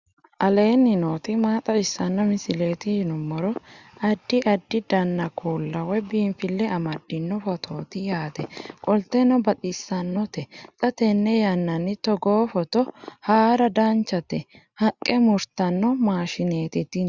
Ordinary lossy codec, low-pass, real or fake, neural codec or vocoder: Opus, 64 kbps; 7.2 kHz; real; none